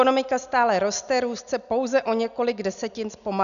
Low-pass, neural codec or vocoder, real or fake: 7.2 kHz; none; real